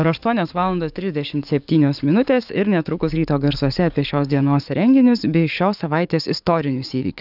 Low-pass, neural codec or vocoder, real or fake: 5.4 kHz; codec, 44.1 kHz, 7.8 kbps, DAC; fake